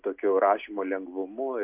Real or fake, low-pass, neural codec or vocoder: real; 3.6 kHz; none